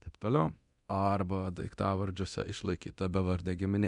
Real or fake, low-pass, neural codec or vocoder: fake; 10.8 kHz; codec, 24 kHz, 0.9 kbps, DualCodec